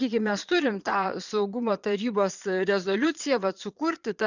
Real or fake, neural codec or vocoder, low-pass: fake; vocoder, 22.05 kHz, 80 mel bands, Vocos; 7.2 kHz